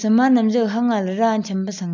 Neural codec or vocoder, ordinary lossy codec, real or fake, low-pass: none; MP3, 64 kbps; real; 7.2 kHz